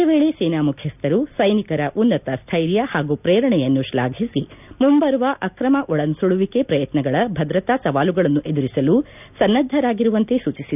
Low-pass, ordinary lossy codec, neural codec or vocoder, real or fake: 3.6 kHz; none; none; real